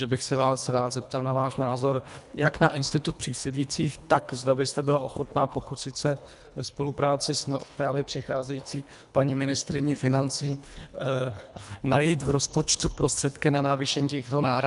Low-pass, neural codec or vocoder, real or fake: 10.8 kHz; codec, 24 kHz, 1.5 kbps, HILCodec; fake